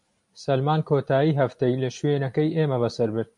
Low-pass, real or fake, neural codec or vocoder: 10.8 kHz; real; none